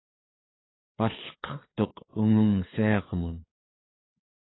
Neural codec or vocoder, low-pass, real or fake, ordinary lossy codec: codec, 16 kHz, 4 kbps, FreqCodec, larger model; 7.2 kHz; fake; AAC, 16 kbps